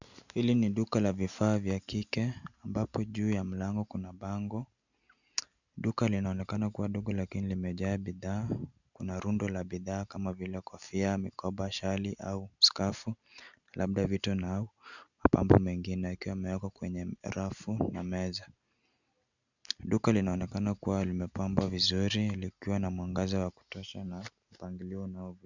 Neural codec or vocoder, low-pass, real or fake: none; 7.2 kHz; real